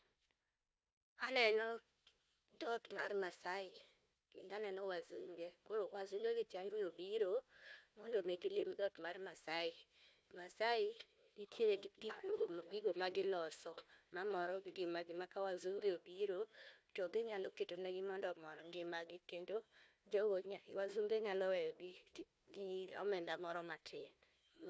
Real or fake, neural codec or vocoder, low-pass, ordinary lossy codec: fake; codec, 16 kHz, 1 kbps, FunCodec, trained on Chinese and English, 50 frames a second; none; none